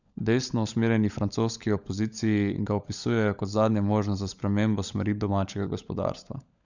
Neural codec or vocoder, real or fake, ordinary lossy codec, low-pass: codec, 16 kHz, 16 kbps, FunCodec, trained on LibriTTS, 50 frames a second; fake; none; 7.2 kHz